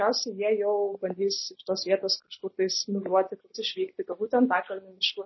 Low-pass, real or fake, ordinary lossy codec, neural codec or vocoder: 7.2 kHz; real; MP3, 24 kbps; none